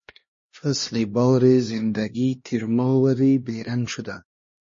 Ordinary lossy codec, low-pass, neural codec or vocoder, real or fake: MP3, 32 kbps; 7.2 kHz; codec, 16 kHz, 1 kbps, X-Codec, HuBERT features, trained on LibriSpeech; fake